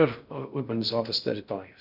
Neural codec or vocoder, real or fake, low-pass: codec, 16 kHz in and 24 kHz out, 0.6 kbps, FocalCodec, streaming, 4096 codes; fake; 5.4 kHz